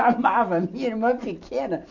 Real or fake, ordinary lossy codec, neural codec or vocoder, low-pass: real; none; none; 7.2 kHz